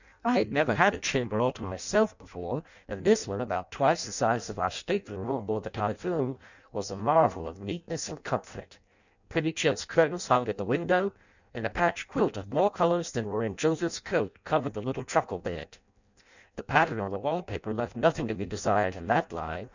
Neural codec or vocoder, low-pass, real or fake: codec, 16 kHz in and 24 kHz out, 0.6 kbps, FireRedTTS-2 codec; 7.2 kHz; fake